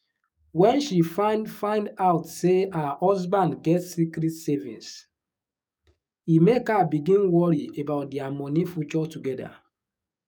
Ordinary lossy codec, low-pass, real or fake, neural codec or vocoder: none; 19.8 kHz; fake; codec, 44.1 kHz, 7.8 kbps, DAC